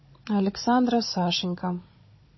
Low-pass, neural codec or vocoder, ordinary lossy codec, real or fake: 7.2 kHz; codec, 16 kHz, 16 kbps, FunCodec, trained on Chinese and English, 50 frames a second; MP3, 24 kbps; fake